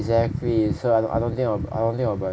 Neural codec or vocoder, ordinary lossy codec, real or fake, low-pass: none; none; real; none